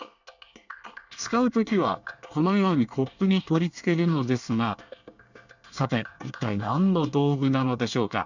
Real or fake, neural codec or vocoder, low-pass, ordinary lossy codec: fake; codec, 24 kHz, 1 kbps, SNAC; 7.2 kHz; none